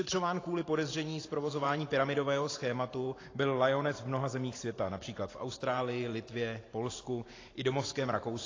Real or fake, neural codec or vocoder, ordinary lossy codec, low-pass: fake; vocoder, 44.1 kHz, 128 mel bands every 512 samples, BigVGAN v2; AAC, 32 kbps; 7.2 kHz